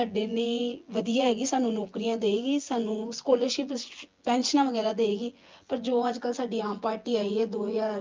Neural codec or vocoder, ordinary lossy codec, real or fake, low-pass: vocoder, 24 kHz, 100 mel bands, Vocos; Opus, 32 kbps; fake; 7.2 kHz